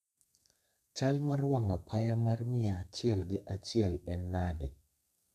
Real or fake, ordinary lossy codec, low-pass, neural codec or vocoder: fake; none; 14.4 kHz; codec, 32 kHz, 1.9 kbps, SNAC